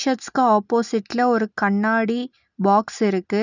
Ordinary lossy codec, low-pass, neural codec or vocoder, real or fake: none; 7.2 kHz; none; real